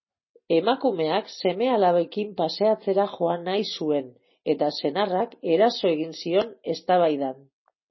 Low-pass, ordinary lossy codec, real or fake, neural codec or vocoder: 7.2 kHz; MP3, 24 kbps; real; none